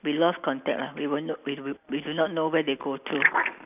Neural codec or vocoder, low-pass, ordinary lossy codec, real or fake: none; 3.6 kHz; none; real